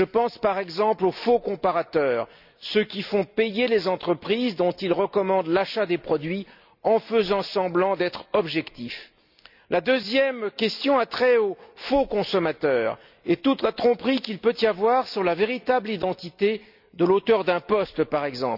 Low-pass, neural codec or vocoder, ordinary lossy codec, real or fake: 5.4 kHz; none; none; real